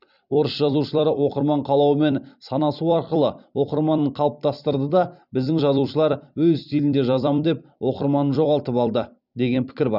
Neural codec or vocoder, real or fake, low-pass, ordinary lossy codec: vocoder, 44.1 kHz, 128 mel bands every 256 samples, BigVGAN v2; fake; 5.4 kHz; none